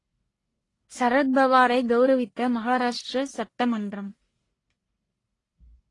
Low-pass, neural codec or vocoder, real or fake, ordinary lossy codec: 10.8 kHz; codec, 44.1 kHz, 1.7 kbps, Pupu-Codec; fake; AAC, 32 kbps